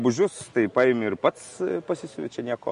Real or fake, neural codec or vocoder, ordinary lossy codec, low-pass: real; none; MP3, 48 kbps; 10.8 kHz